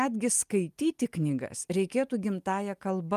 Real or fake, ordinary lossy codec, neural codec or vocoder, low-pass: real; Opus, 32 kbps; none; 14.4 kHz